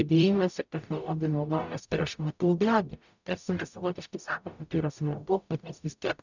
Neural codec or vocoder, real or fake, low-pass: codec, 44.1 kHz, 0.9 kbps, DAC; fake; 7.2 kHz